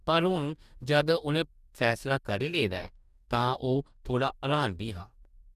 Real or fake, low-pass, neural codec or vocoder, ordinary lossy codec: fake; 14.4 kHz; codec, 44.1 kHz, 2.6 kbps, DAC; none